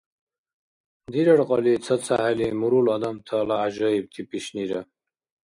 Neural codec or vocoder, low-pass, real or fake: none; 10.8 kHz; real